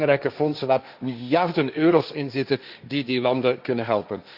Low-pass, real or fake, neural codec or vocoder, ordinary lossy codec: 5.4 kHz; fake; codec, 16 kHz, 1.1 kbps, Voila-Tokenizer; Opus, 64 kbps